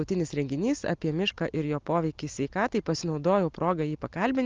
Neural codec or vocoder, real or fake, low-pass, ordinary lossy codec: none; real; 7.2 kHz; Opus, 32 kbps